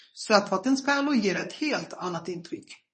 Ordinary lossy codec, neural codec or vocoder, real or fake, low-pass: MP3, 32 kbps; codec, 24 kHz, 0.9 kbps, WavTokenizer, medium speech release version 1; fake; 10.8 kHz